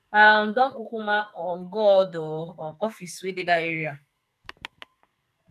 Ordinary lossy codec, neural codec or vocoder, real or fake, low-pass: none; codec, 44.1 kHz, 2.6 kbps, SNAC; fake; 14.4 kHz